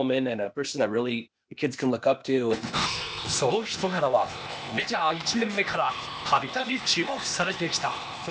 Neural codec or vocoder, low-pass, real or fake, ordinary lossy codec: codec, 16 kHz, 0.8 kbps, ZipCodec; none; fake; none